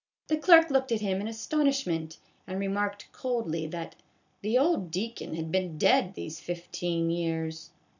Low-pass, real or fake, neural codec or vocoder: 7.2 kHz; real; none